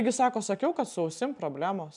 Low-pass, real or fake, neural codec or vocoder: 10.8 kHz; real; none